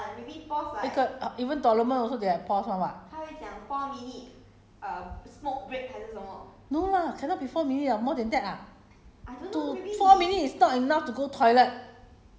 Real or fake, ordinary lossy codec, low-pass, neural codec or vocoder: real; none; none; none